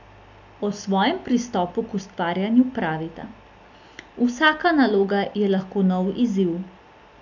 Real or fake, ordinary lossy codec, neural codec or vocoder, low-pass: real; none; none; 7.2 kHz